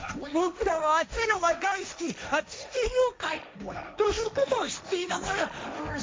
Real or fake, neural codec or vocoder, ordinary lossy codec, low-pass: fake; codec, 16 kHz, 1.1 kbps, Voila-Tokenizer; none; none